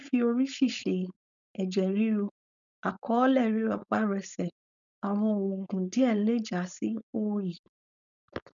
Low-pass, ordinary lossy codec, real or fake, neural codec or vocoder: 7.2 kHz; none; fake; codec, 16 kHz, 4.8 kbps, FACodec